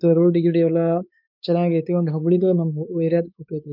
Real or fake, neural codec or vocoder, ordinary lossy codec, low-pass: fake; codec, 16 kHz, 4 kbps, X-Codec, WavLM features, trained on Multilingual LibriSpeech; none; 5.4 kHz